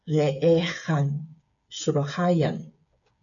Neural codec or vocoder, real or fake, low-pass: codec, 16 kHz, 8 kbps, FreqCodec, smaller model; fake; 7.2 kHz